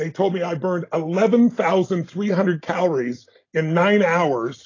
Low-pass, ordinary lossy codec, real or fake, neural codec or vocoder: 7.2 kHz; AAC, 32 kbps; real; none